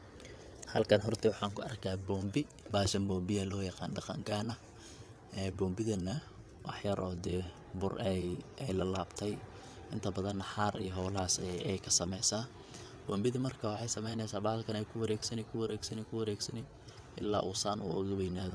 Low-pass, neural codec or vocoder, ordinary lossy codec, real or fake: none; vocoder, 22.05 kHz, 80 mel bands, WaveNeXt; none; fake